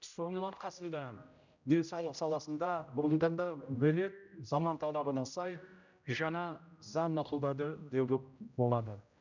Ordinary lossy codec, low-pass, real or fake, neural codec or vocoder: none; 7.2 kHz; fake; codec, 16 kHz, 0.5 kbps, X-Codec, HuBERT features, trained on general audio